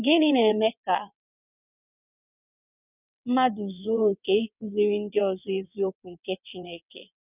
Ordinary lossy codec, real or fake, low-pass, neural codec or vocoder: none; fake; 3.6 kHz; vocoder, 22.05 kHz, 80 mel bands, WaveNeXt